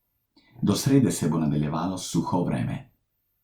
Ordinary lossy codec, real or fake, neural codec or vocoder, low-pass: Opus, 64 kbps; real; none; 19.8 kHz